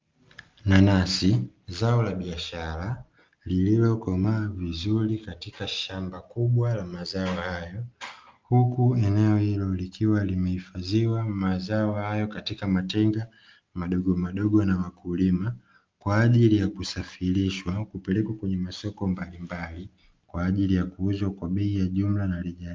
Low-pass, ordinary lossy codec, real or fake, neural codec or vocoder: 7.2 kHz; Opus, 32 kbps; real; none